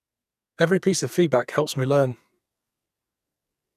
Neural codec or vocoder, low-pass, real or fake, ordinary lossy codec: codec, 44.1 kHz, 2.6 kbps, SNAC; 14.4 kHz; fake; none